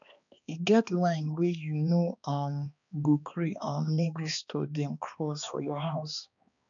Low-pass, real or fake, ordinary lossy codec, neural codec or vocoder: 7.2 kHz; fake; none; codec, 16 kHz, 2 kbps, X-Codec, HuBERT features, trained on balanced general audio